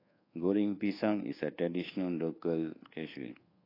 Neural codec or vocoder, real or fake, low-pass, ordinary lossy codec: codec, 24 kHz, 1.2 kbps, DualCodec; fake; 5.4 kHz; AAC, 24 kbps